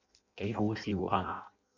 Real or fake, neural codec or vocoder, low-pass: fake; codec, 16 kHz in and 24 kHz out, 0.6 kbps, FireRedTTS-2 codec; 7.2 kHz